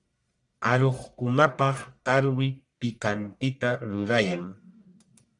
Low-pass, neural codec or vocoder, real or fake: 10.8 kHz; codec, 44.1 kHz, 1.7 kbps, Pupu-Codec; fake